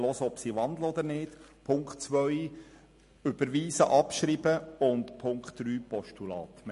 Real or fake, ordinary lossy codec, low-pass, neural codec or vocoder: real; MP3, 48 kbps; 14.4 kHz; none